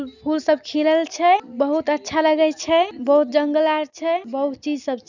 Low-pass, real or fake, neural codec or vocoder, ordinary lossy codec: 7.2 kHz; real; none; none